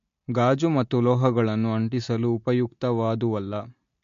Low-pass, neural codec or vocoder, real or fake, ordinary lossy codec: 7.2 kHz; none; real; MP3, 48 kbps